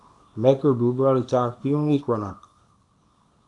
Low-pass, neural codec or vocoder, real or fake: 10.8 kHz; codec, 24 kHz, 0.9 kbps, WavTokenizer, small release; fake